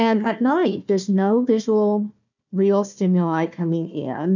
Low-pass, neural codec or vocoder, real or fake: 7.2 kHz; codec, 16 kHz, 1 kbps, FunCodec, trained on Chinese and English, 50 frames a second; fake